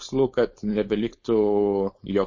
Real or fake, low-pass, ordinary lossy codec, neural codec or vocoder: fake; 7.2 kHz; MP3, 32 kbps; codec, 16 kHz, 4.8 kbps, FACodec